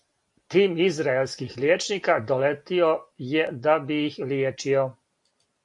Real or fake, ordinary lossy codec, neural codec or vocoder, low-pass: real; AAC, 64 kbps; none; 10.8 kHz